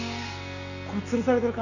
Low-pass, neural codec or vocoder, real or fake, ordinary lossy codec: 7.2 kHz; none; real; none